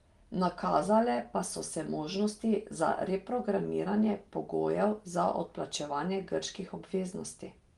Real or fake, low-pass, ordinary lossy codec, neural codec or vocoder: real; 10.8 kHz; Opus, 24 kbps; none